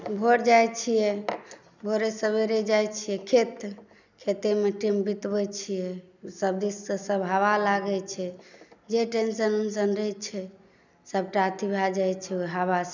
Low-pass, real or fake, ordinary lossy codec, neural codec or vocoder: 7.2 kHz; real; none; none